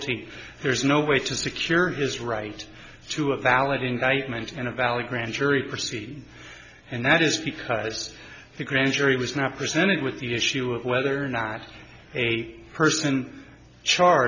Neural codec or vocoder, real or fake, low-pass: none; real; 7.2 kHz